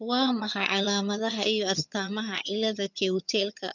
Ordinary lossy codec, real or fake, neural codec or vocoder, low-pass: none; fake; vocoder, 22.05 kHz, 80 mel bands, HiFi-GAN; 7.2 kHz